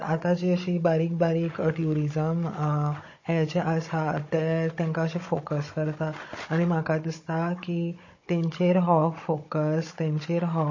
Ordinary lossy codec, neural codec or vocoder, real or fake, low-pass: MP3, 32 kbps; codec, 16 kHz, 16 kbps, FunCodec, trained on Chinese and English, 50 frames a second; fake; 7.2 kHz